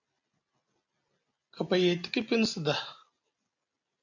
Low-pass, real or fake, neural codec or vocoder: 7.2 kHz; real; none